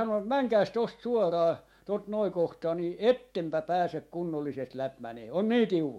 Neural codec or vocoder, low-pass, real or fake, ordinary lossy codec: autoencoder, 48 kHz, 128 numbers a frame, DAC-VAE, trained on Japanese speech; 19.8 kHz; fake; MP3, 64 kbps